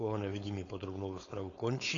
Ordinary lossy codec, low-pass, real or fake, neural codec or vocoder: AAC, 32 kbps; 7.2 kHz; fake; codec, 16 kHz, 4.8 kbps, FACodec